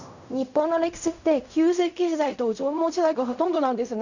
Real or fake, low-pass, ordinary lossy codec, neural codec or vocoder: fake; 7.2 kHz; none; codec, 16 kHz in and 24 kHz out, 0.4 kbps, LongCat-Audio-Codec, fine tuned four codebook decoder